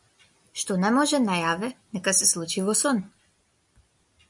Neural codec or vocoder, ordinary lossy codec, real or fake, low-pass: none; MP3, 96 kbps; real; 10.8 kHz